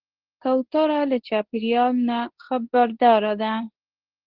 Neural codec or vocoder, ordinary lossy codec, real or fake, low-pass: codec, 24 kHz, 0.9 kbps, WavTokenizer, medium speech release version 2; Opus, 16 kbps; fake; 5.4 kHz